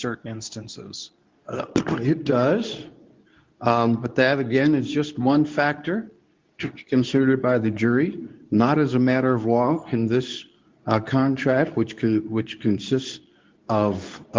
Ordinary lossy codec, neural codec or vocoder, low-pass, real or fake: Opus, 32 kbps; codec, 24 kHz, 0.9 kbps, WavTokenizer, medium speech release version 2; 7.2 kHz; fake